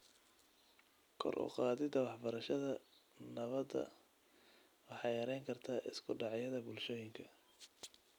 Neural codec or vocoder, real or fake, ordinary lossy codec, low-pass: none; real; none; none